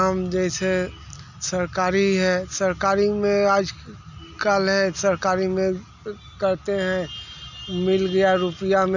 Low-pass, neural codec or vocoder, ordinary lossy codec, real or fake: 7.2 kHz; none; none; real